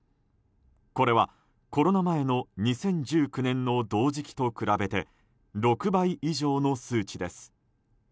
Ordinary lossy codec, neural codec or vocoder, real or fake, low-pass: none; none; real; none